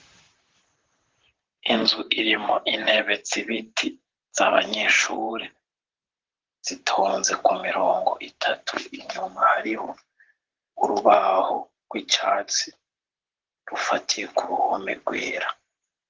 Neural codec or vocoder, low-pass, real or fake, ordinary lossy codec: vocoder, 44.1 kHz, 128 mel bands, Pupu-Vocoder; 7.2 kHz; fake; Opus, 16 kbps